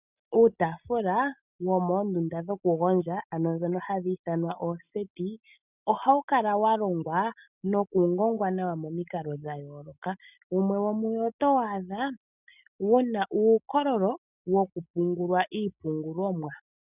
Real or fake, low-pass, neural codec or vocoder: real; 3.6 kHz; none